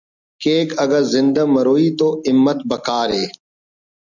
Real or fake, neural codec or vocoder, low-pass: real; none; 7.2 kHz